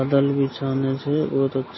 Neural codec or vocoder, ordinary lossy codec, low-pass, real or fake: none; MP3, 24 kbps; 7.2 kHz; real